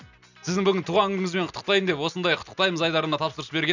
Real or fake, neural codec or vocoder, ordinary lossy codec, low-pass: real; none; none; 7.2 kHz